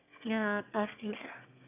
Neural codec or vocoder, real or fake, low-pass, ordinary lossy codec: autoencoder, 22.05 kHz, a latent of 192 numbers a frame, VITS, trained on one speaker; fake; 3.6 kHz; none